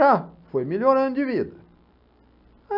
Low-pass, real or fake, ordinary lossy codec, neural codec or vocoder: 5.4 kHz; real; none; none